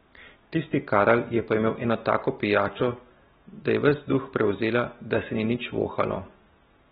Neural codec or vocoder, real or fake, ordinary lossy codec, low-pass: none; real; AAC, 16 kbps; 19.8 kHz